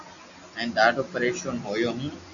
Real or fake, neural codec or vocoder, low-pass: real; none; 7.2 kHz